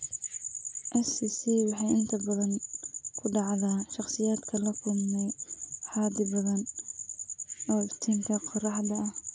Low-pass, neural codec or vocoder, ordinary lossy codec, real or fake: none; none; none; real